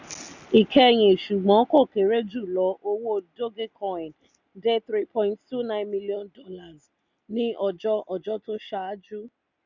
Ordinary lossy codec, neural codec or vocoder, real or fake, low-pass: none; none; real; 7.2 kHz